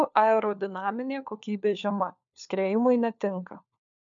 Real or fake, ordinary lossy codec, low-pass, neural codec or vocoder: fake; MP3, 64 kbps; 7.2 kHz; codec, 16 kHz, 4 kbps, FunCodec, trained on LibriTTS, 50 frames a second